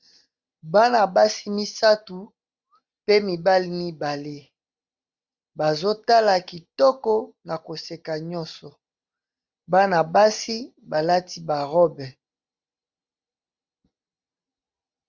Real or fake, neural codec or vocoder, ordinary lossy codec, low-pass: real; none; Opus, 64 kbps; 7.2 kHz